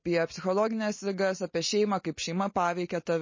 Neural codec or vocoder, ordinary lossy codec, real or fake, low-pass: none; MP3, 32 kbps; real; 7.2 kHz